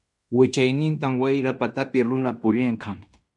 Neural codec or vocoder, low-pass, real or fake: codec, 16 kHz in and 24 kHz out, 0.9 kbps, LongCat-Audio-Codec, fine tuned four codebook decoder; 10.8 kHz; fake